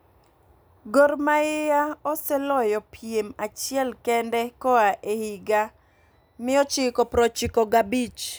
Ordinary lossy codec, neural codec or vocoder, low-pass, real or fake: none; none; none; real